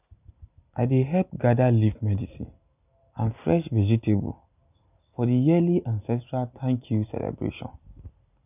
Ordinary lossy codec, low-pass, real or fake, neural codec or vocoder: none; 3.6 kHz; real; none